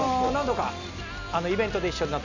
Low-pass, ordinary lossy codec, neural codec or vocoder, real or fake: 7.2 kHz; none; none; real